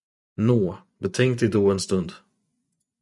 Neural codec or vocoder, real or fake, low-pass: vocoder, 24 kHz, 100 mel bands, Vocos; fake; 10.8 kHz